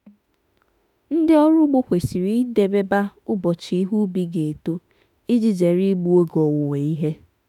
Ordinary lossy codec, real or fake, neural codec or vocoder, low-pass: none; fake; autoencoder, 48 kHz, 32 numbers a frame, DAC-VAE, trained on Japanese speech; 19.8 kHz